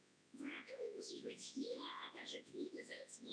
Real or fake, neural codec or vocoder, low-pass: fake; codec, 24 kHz, 0.9 kbps, WavTokenizer, large speech release; 9.9 kHz